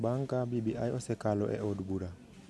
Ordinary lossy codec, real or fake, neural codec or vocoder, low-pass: none; real; none; none